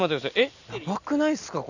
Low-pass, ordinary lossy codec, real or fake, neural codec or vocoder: 7.2 kHz; none; real; none